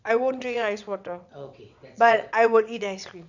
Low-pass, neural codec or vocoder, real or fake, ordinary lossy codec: 7.2 kHz; vocoder, 22.05 kHz, 80 mel bands, WaveNeXt; fake; none